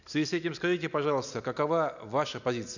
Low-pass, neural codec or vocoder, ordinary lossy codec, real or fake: 7.2 kHz; none; none; real